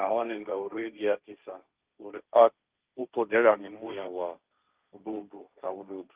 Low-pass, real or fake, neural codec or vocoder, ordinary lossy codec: 3.6 kHz; fake; codec, 16 kHz, 1.1 kbps, Voila-Tokenizer; Opus, 24 kbps